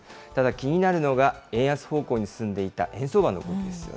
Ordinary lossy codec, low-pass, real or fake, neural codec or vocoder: none; none; real; none